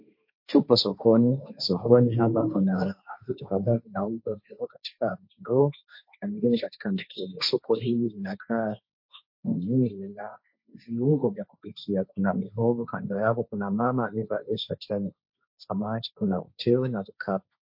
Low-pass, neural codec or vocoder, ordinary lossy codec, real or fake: 5.4 kHz; codec, 16 kHz, 1.1 kbps, Voila-Tokenizer; MP3, 32 kbps; fake